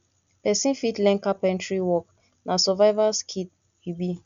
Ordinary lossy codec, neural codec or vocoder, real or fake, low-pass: none; none; real; 7.2 kHz